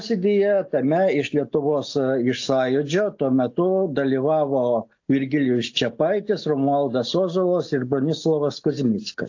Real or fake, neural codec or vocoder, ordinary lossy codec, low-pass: real; none; AAC, 48 kbps; 7.2 kHz